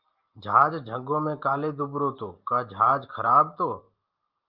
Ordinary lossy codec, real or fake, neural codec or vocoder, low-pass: Opus, 32 kbps; real; none; 5.4 kHz